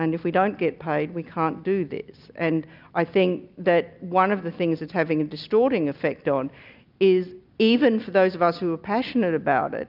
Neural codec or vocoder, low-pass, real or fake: none; 5.4 kHz; real